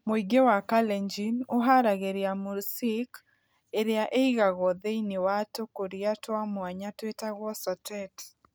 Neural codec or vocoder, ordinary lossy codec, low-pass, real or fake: none; none; none; real